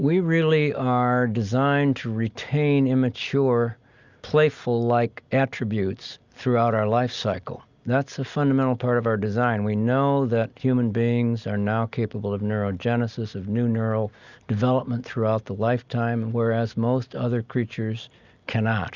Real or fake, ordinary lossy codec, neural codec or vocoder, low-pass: real; Opus, 64 kbps; none; 7.2 kHz